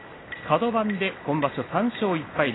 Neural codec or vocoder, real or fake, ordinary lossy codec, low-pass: none; real; AAC, 16 kbps; 7.2 kHz